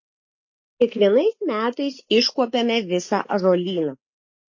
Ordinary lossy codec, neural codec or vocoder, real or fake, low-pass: MP3, 32 kbps; none; real; 7.2 kHz